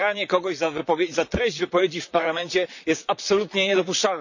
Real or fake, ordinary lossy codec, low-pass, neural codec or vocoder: fake; none; 7.2 kHz; vocoder, 44.1 kHz, 128 mel bands, Pupu-Vocoder